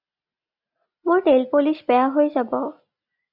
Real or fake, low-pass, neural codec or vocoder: real; 5.4 kHz; none